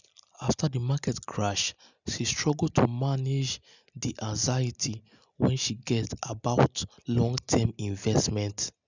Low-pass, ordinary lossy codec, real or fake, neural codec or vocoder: 7.2 kHz; none; real; none